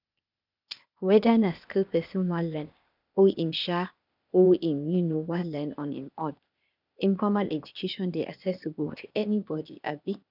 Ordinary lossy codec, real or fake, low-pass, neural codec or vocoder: none; fake; 5.4 kHz; codec, 16 kHz, 0.8 kbps, ZipCodec